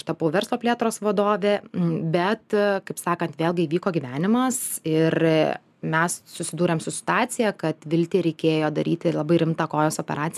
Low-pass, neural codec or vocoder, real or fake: 14.4 kHz; none; real